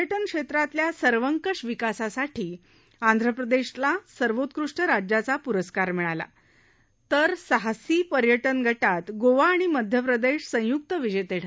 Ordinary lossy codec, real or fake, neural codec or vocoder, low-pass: none; real; none; none